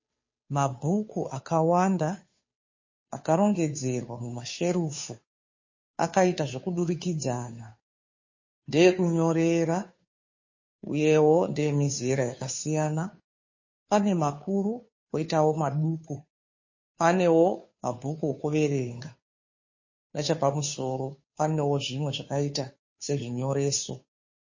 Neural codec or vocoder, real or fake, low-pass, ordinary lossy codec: codec, 16 kHz, 2 kbps, FunCodec, trained on Chinese and English, 25 frames a second; fake; 7.2 kHz; MP3, 32 kbps